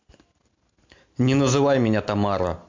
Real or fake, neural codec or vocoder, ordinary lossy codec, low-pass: real; none; MP3, 48 kbps; 7.2 kHz